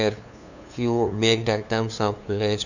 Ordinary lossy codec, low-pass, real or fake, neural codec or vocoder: none; 7.2 kHz; fake; codec, 16 kHz, 2 kbps, FunCodec, trained on LibriTTS, 25 frames a second